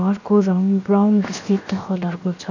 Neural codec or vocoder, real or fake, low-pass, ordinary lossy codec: codec, 16 kHz, 0.7 kbps, FocalCodec; fake; 7.2 kHz; none